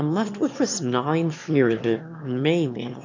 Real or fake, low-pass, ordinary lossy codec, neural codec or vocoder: fake; 7.2 kHz; MP3, 48 kbps; autoencoder, 22.05 kHz, a latent of 192 numbers a frame, VITS, trained on one speaker